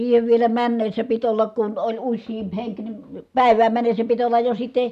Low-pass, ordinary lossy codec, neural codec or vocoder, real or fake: 14.4 kHz; none; none; real